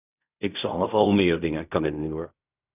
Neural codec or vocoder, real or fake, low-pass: codec, 16 kHz in and 24 kHz out, 0.4 kbps, LongCat-Audio-Codec, fine tuned four codebook decoder; fake; 3.6 kHz